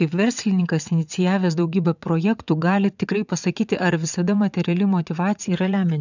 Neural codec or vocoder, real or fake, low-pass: vocoder, 22.05 kHz, 80 mel bands, WaveNeXt; fake; 7.2 kHz